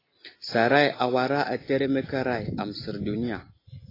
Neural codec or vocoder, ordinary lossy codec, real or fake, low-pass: none; AAC, 24 kbps; real; 5.4 kHz